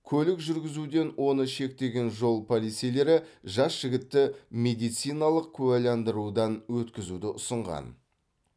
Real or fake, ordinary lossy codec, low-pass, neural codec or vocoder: real; none; none; none